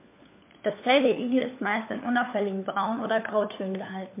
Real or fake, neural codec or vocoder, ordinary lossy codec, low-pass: fake; codec, 16 kHz, 4 kbps, FunCodec, trained on LibriTTS, 50 frames a second; MP3, 24 kbps; 3.6 kHz